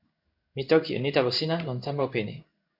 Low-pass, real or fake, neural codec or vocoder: 5.4 kHz; fake; codec, 16 kHz in and 24 kHz out, 1 kbps, XY-Tokenizer